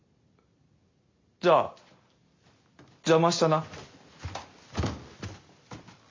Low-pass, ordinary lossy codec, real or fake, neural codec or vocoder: 7.2 kHz; MP3, 48 kbps; real; none